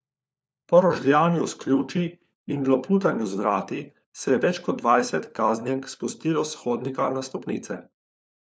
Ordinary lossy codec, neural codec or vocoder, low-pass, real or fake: none; codec, 16 kHz, 4 kbps, FunCodec, trained on LibriTTS, 50 frames a second; none; fake